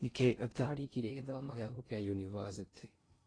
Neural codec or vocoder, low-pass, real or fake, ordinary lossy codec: codec, 16 kHz in and 24 kHz out, 0.6 kbps, FocalCodec, streaming, 2048 codes; 9.9 kHz; fake; AAC, 32 kbps